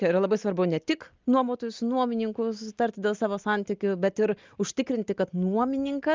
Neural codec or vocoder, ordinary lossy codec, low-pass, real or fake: none; Opus, 24 kbps; 7.2 kHz; real